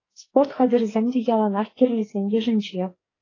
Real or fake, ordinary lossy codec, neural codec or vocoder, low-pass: fake; AAC, 32 kbps; codec, 44.1 kHz, 2.6 kbps, SNAC; 7.2 kHz